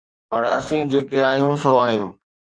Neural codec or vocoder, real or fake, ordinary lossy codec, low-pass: codec, 16 kHz in and 24 kHz out, 1.1 kbps, FireRedTTS-2 codec; fake; MP3, 64 kbps; 9.9 kHz